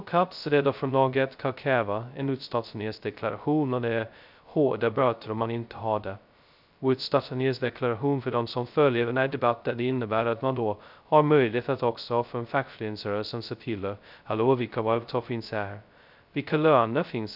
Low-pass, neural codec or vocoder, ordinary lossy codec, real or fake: 5.4 kHz; codec, 16 kHz, 0.2 kbps, FocalCodec; none; fake